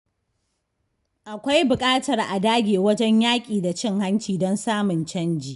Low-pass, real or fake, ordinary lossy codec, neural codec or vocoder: 10.8 kHz; real; none; none